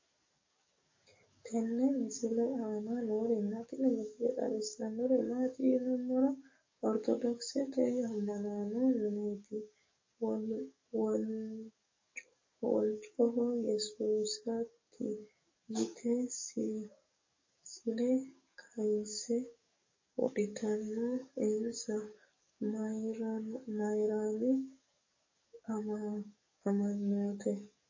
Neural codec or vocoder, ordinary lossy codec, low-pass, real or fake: codec, 44.1 kHz, 7.8 kbps, DAC; MP3, 32 kbps; 7.2 kHz; fake